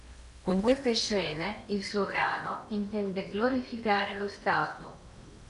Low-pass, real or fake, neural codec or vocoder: 10.8 kHz; fake; codec, 16 kHz in and 24 kHz out, 0.8 kbps, FocalCodec, streaming, 65536 codes